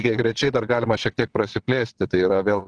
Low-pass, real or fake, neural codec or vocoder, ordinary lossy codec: 9.9 kHz; fake; vocoder, 22.05 kHz, 80 mel bands, WaveNeXt; Opus, 16 kbps